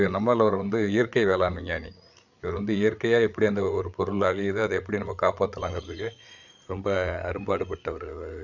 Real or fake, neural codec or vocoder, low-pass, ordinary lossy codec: fake; codec, 16 kHz, 8 kbps, FreqCodec, larger model; 7.2 kHz; none